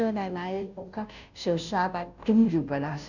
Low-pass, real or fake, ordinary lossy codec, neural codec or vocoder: 7.2 kHz; fake; none; codec, 16 kHz, 0.5 kbps, FunCodec, trained on Chinese and English, 25 frames a second